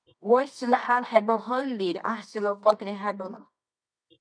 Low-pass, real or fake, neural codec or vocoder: 9.9 kHz; fake; codec, 24 kHz, 0.9 kbps, WavTokenizer, medium music audio release